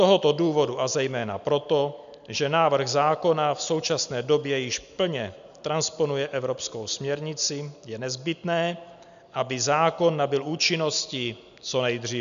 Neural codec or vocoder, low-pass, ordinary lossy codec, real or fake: none; 7.2 kHz; AAC, 96 kbps; real